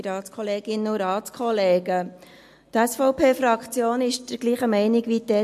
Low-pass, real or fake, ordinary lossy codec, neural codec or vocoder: 14.4 kHz; real; MP3, 64 kbps; none